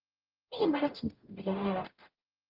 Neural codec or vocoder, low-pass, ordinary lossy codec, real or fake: codec, 44.1 kHz, 0.9 kbps, DAC; 5.4 kHz; Opus, 24 kbps; fake